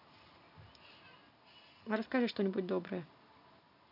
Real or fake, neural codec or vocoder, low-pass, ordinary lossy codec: real; none; 5.4 kHz; none